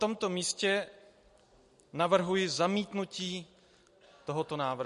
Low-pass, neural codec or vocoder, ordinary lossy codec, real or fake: 14.4 kHz; none; MP3, 48 kbps; real